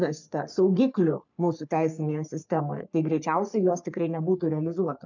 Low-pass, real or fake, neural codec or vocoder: 7.2 kHz; fake; codec, 44.1 kHz, 3.4 kbps, Pupu-Codec